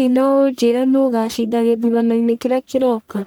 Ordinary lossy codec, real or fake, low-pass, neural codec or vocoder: none; fake; none; codec, 44.1 kHz, 1.7 kbps, Pupu-Codec